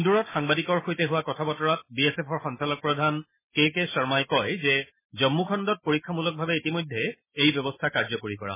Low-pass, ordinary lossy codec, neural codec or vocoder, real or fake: 3.6 kHz; MP3, 16 kbps; none; real